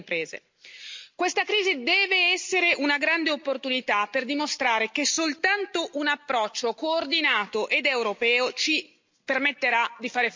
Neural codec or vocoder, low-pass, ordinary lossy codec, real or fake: none; 7.2 kHz; MP3, 64 kbps; real